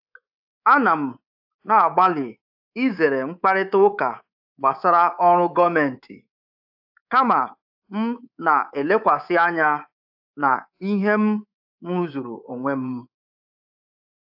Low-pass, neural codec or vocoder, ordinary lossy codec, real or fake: 5.4 kHz; codec, 24 kHz, 3.1 kbps, DualCodec; AAC, 48 kbps; fake